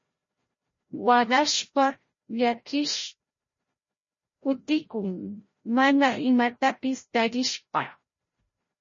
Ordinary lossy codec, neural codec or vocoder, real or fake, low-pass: MP3, 32 kbps; codec, 16 kHz, 0.5 kbps, FreqCodec, larger model; fake; 7.2 kHz